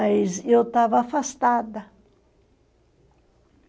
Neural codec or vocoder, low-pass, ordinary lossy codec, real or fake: none; none; none; real